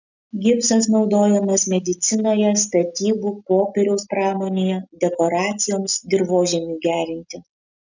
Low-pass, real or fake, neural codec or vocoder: 7.2 kHz; real; none